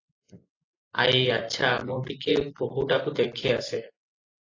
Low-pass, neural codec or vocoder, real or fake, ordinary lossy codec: 7.2 kHz; none; real; AAC, 32 kbps